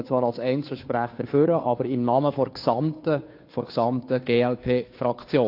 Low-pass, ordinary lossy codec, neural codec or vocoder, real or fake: 5.4 kHz; AAC, 32 kbps; codec, 16 kHz, 2 kbps, FunCodec, trained on Chinese and English, 25 frames a second; fake